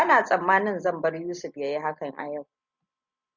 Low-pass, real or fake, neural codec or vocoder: 7.2 kHz; real; none